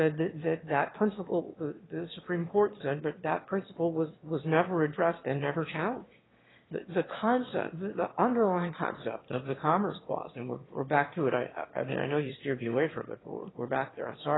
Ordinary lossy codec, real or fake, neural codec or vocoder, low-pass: AAC, 16 kbps; fake; autoencoder, 22.05 kHz, a latent of 192 numbers a frame, VITS, trained on one speaker; 7.2 kHz